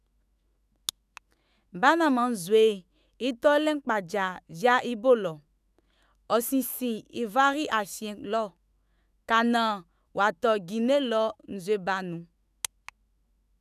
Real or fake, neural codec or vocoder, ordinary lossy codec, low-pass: fake; autoencoder, 48 kHz, 128 numbers a frame, DAC-VAE, trained on Japanese speech; none; 14.4 kHz